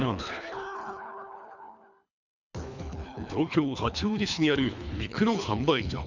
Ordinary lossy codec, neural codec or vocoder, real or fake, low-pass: none; codec, 24 kHz, 3 kbps, HILCodec; fake; 7.2 kHz